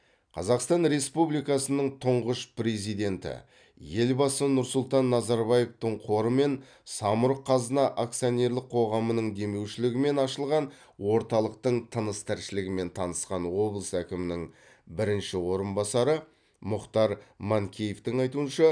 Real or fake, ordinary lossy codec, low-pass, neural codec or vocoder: real; none; 9.9 kHz; none